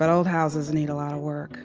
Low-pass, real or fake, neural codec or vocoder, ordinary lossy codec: 7.2 kHz; real; none; Opus, 24 kbps